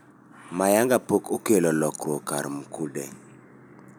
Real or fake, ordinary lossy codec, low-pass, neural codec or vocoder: real; none; none; none